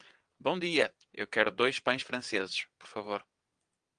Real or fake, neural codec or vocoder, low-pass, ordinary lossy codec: fake; vocoder, 22.05 kHz, 80 mel bands, WaveNeXt; 9.9 kHz; Opus, 32 kbps